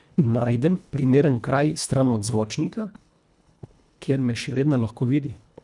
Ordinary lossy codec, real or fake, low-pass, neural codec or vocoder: none; fake; 10.8 kHz; codec, 24 kHz, 1.5 kbps, HILCodec